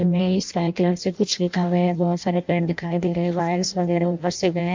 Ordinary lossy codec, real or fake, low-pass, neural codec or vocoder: MP3, 64 kbps; fake; 7.2 kHz; codec, 16 kHz in and 24 kHz out, 0.6 kbps, FireRedTTS-2 codec